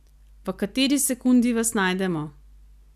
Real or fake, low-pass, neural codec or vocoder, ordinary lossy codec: real; 14.4 kHz; none; none